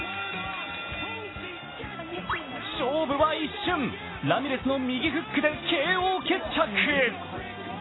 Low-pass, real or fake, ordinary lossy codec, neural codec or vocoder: 7.2 kHz; real; AAC, 16 kbps; none